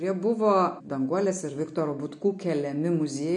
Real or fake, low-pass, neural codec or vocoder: real; 10.8 kHz; none